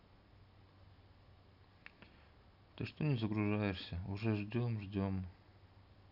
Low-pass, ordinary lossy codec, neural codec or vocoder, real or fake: 5.4 kHz; none; none; real